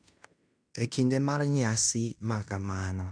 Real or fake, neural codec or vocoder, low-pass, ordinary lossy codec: fake; codec, 16 kHz in and 24 kHz out, 0.9 kbps, LongCat-Audio-Codec, fine tuned four codebook decoder; 9.9 kHz; none